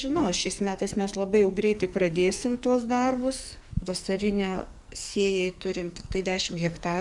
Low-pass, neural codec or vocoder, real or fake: 10.8 kHz; codec, 44.1 kHz, 2.6 kbps, SNAC; fake